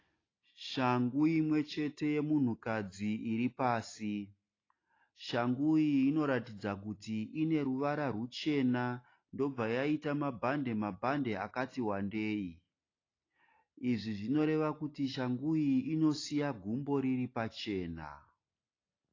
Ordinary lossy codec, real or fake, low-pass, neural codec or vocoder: AAC, 32 kbps; real; 7.2 kHz; none